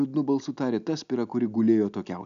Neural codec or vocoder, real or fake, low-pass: none; real; 7.2 kHz